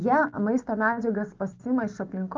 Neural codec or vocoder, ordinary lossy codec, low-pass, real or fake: none; Opus, 24 kbps; 7.2 kHz; real